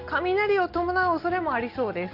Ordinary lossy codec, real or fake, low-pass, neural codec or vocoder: Opus, 24 kbps; real; 5.4 kHz; none